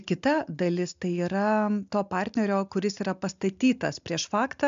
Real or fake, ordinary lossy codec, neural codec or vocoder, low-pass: real; MP3, 64 kbps; none; 7.2 kHz